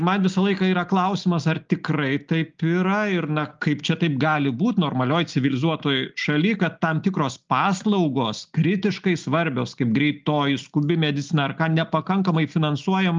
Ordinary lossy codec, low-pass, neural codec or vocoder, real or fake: Opus, 24 kbps; 7.2 kHz; none; real